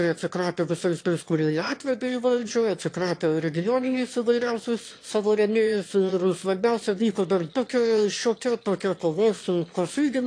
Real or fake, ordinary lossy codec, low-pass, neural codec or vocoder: fake; AAC, 48 kbps; 9.9 kHz; autoencoder, 22.05 kHz, a latent of 192 numbers a frame, VITS, trained on one speaker